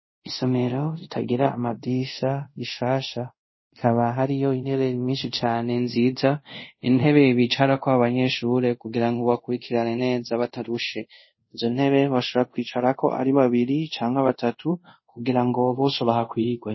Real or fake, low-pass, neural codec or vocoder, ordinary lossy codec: fake; 7.2 kHz; codec, 24 kHz, 0.5 kbps, DualCodec; MP3, 24 kbps